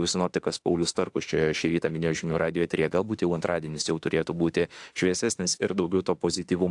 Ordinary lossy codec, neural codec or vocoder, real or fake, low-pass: AAC, 64 kbps; autoencoder, 48 kHz, 32 numbers a frame, DAC-VAE, trained on Japanese speech; fake; 10.8 kHz